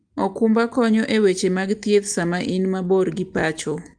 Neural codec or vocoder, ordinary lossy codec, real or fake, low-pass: none; Opus, 24 kbps; real; 9.9 kHz